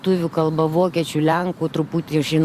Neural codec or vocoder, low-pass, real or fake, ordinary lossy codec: vocoder, 44.1 kHz, 128 mel bands every 512 samples, BigVGAN v2; 14.4 kHz; fake; Opus, 64 kbps